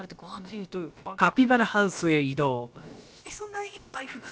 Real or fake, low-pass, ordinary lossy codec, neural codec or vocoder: fake; none; none; codec, 16 kHz, about 1 kbps, DyCAST, with the encoder's durations